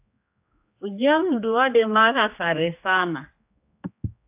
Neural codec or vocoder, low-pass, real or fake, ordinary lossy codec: codec, 16 kHz, 2 kbps, X-Codec, HuBERT features, trained on general audio; 3.6 kHz; fake; AAC, 32 kbps